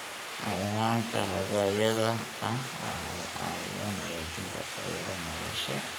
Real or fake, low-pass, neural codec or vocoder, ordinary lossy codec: fake; none; codec, 44.1 kHz, 3.4 kbps, Pupu-Codec; none